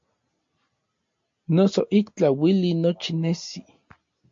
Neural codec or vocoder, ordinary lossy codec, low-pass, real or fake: none; MP3, 48 kbps; 7.2 kHz; real